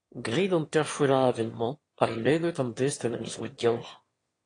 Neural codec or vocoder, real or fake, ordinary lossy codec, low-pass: autoencoder, 22.05 kHz, a latent of 192 numbers a frame, VITS, trained on one speaker; fake; AAC, 32 kbps; 9.9 kHz